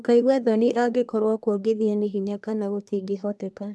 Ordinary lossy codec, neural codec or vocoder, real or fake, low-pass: none; codec, 24 kHz, 1 kbps, SNAC; fake; none